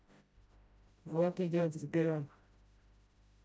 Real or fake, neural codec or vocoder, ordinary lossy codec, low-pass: fake; codec, 16 kHz, 0.5 kbps, FreqCodec, smaller model; none; none